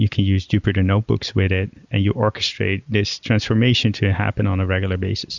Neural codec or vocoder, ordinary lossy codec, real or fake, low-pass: none; Opus, 64 kbps; real; 7.2 kHz